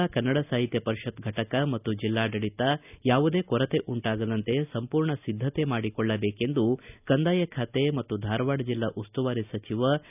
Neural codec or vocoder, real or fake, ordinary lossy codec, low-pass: none; real; none; 3.6 kHz